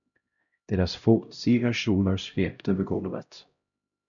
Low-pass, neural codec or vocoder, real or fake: 7.2 kHz; codec, 16 kHz, 0.5 kbps, X-Codec, HuBERT features, trained on LibriSpeech; fake